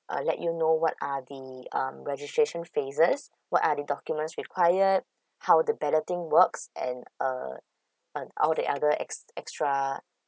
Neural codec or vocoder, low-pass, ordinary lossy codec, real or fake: none; none; none; real